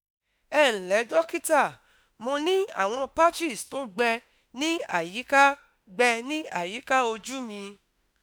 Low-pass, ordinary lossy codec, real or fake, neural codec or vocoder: none; none; fake; autoencoder, 48 kHz, 32 numbers a frame, DAC-VAE, trained on Japanese speech